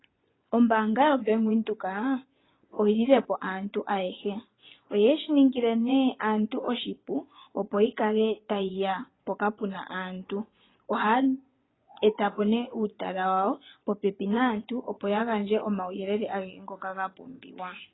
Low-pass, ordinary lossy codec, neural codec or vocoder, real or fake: 7.2 kHz; AAC, 16 kbps; vocoder, 24 kHz, 100 mel bands, Vocos; fake